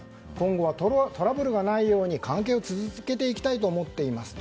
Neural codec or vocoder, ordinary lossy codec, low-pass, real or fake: none; none; none; real